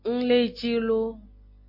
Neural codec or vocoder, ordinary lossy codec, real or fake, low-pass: none; MP3, 32 kbps; real; 5.4 kHz